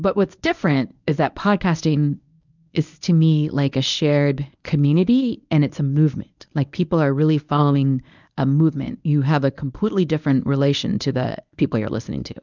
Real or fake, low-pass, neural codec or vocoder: fake; 7.2 kHz; codec, 24 kHz, 0.9 kbps, WavTokenizer, medium speech release version 1